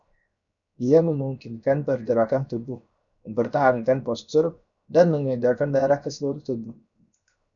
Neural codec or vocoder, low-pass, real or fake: codec, 16 kHz, 0.7 kbps, FocalCodec; 7.2 kHz; fake